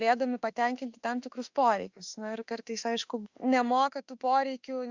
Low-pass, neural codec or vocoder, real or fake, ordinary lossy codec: 7.2 kHz; autoencoder, 48 kHz, 32 numbers a frame, DAC-VAE, trained on Japanese speech; fake; Opus, 64 kbps